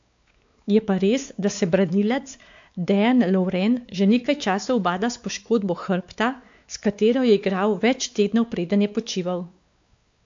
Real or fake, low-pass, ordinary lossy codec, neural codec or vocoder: fake; 7.2 kHz; AAC, 64 kbps; codec, 16 kHz, 4 kbps, X-Codec, WavLM features, trained on Multilingual LibriSpeech